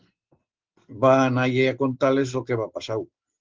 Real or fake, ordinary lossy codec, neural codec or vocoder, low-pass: real; Opus, 16 kbps; none; 7.2 kHz